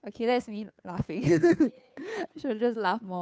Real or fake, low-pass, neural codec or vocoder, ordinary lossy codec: fake; none; codec, 16 kHz, 2 kbps, FunCodec, trained on Chinese and English, 25 frames a second; none